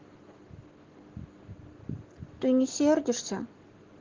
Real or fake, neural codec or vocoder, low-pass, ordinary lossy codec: real; none; 7.2 kHz; Opus, 16 kbps